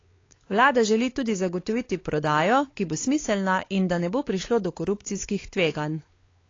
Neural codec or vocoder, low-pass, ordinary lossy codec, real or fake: codec, 16 kHz, 4 kbps, X-Codec, WavLM features, trained on Multilingual LibriSpeech; 7.2 kHz; AAC, 32 kbps; fake